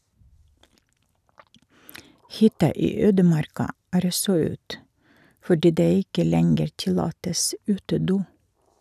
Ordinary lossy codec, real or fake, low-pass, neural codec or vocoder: none; real; 14.4 kHz; none